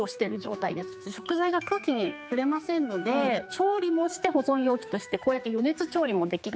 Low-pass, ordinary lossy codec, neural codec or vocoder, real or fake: none; none; codec, 16 kHz, 4 kbps, X-Codec, HuBERT features, trained on general audio; fake